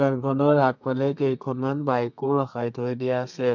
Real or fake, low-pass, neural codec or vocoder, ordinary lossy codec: fake; 7.2 kHz; codec, 32 kHz, 1.9 kbps, SNAC; MP3, 64 kbps